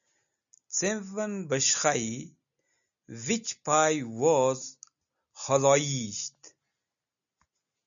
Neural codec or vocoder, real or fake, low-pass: none; real; 7.2 kHz